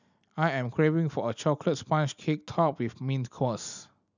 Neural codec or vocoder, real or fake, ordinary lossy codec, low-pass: none; real; none; 7.2 kHz